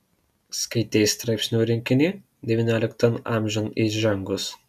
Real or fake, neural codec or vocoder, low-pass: real; none; 14.4 kHz